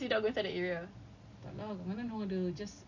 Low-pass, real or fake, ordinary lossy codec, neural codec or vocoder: 7.2 kHz; real; none; none